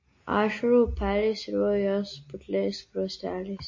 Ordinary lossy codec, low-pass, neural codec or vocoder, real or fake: MP3, 32 kbps; 7.2 kHz; none; real